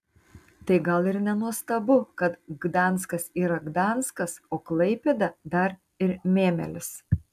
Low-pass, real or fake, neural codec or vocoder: 14.4 kHz; real; none